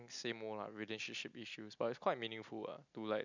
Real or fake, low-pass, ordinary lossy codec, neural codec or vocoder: real; 7.2 kHz; none; none